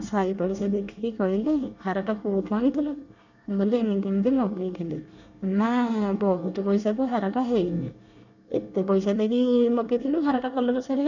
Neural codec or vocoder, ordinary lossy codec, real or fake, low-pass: codec, 24 kHz, 1 kbps, SNAC; none; fake; 7.2 kHz